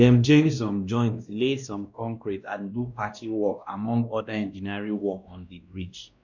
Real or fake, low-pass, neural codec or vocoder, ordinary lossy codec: fake; 7.2 kHz; codec, 16 kHz, 1 kbps, X-Codec, WavLM features, trained on Multilingual LibriSpeech; none